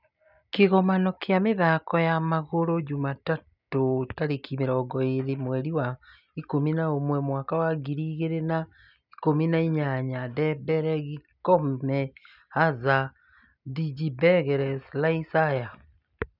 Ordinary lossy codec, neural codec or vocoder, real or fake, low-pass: none; none; real; 5.4 kHz